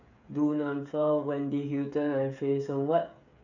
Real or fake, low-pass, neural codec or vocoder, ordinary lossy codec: fake; 7.2 kHz; codec, 16 kHz, 8 kbps, FreqCodec, smaller model; none